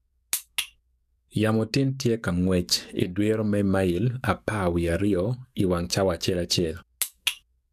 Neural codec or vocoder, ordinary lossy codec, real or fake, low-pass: codec, 44.1 kHz, 7.8 kbps, DAC; none; fake; 14.4 kHz